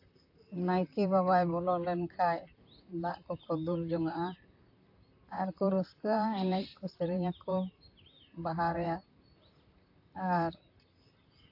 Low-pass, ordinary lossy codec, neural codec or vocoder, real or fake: 5.4 kHz; AAC, 32 kbps; vocoder, 44.1 kHz, 128 mel bands, Pupu-Vocoder; fake